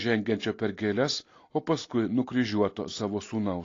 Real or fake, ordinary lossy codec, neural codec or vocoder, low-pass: real; AAC, 32 kbps; none; 7.2 kHz